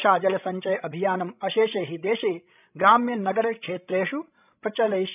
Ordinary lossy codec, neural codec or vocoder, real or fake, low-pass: none; codec, 16 kHz, 16 kbps, FreqCodec, larger model; fake; 3.6 kHz